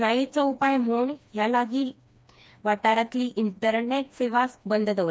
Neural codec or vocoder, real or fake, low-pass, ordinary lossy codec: codec, 16 kHz, 2 kbps, FreqCodec, smaller model; fake; none; none